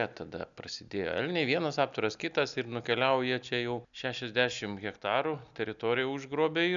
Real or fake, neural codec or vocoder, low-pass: real; none; 7.2 kHz